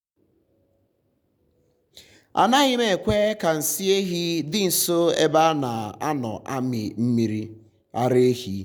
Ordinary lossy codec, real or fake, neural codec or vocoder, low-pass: none; real; none; 19.8 kHz